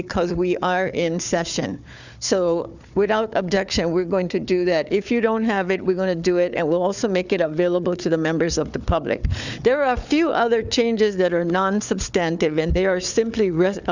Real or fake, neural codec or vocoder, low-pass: fake; codec, 16 kHz, 4 kbps, FunCodec, trained on Chinese and English, 50 frames a second; 7.2 kHz